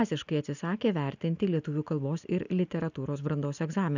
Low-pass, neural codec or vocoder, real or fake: 7.2 kHz; none; real